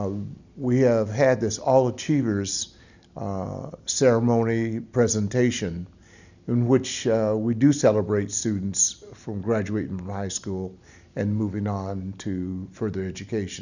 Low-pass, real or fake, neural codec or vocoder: 7.2 kHz; real; none